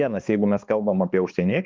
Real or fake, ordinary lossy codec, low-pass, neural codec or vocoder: fake; Opus, 32 kbps; 7.2 kHz; codec, 16 kHz, 2 kbps, X-Codec, HuBERT features, trained on balanced general audio